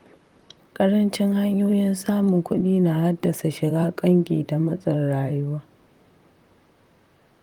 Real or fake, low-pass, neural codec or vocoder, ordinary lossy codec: fake; 19.8 kHz; vocoder, 44.1 kHz, 128 mel bands, Pupu-Vocoder; Opus, 32 kbps